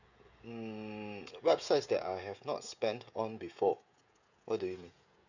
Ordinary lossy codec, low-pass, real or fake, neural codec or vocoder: none; 7.2 kHz; fake; codec, 16 kHz, 16 kbps, FreqCodec, smaller model